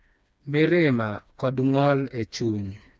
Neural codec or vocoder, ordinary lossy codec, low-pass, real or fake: codec, 16 kHz, 2 kbps, FreqCodec, smaller model; none; none; fake